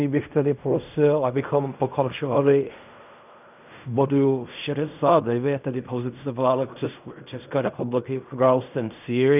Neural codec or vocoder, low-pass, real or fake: codec, 16 kHz in and 24 kHz out, 0.4 kbps, LongCat-Audio-Codec, fine tuned four codebook decoder; 3.6 kHz; fake